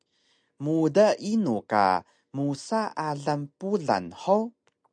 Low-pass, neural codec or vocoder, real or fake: 9.9 kHz; none; real